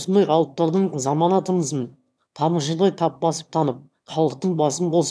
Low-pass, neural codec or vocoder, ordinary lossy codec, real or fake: none; autoencoder, 22.05 kHz, a latent of 192 numbers a frame, VITS, trained on one speaker; none; fake